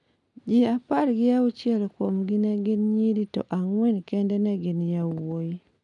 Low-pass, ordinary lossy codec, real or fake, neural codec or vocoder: 10.8 kHz; none; real; none